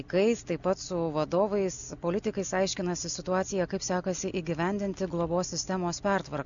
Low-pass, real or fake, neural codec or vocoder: 7.2 kHz; real; none